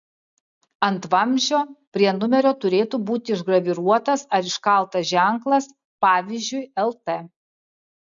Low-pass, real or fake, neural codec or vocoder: 7.2 kHz; real; none